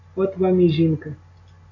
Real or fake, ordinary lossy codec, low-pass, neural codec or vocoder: real; AAC, 32 kbps; 7.2 kHz; none